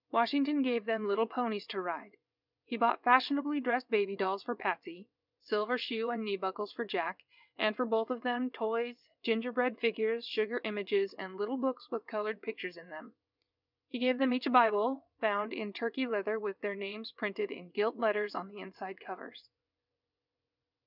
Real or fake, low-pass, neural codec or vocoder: fake; 5.4 kHz; vocoder, 22.05 kHz, 80 mel bands, WaveNeXt